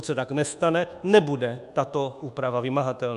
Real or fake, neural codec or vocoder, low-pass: fake; codec, 24 kHz, 1.2 kbps, DualCodec; 10.8 kHz